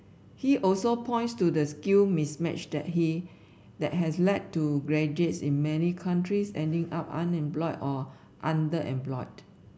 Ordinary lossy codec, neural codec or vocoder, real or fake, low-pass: none; none; real; none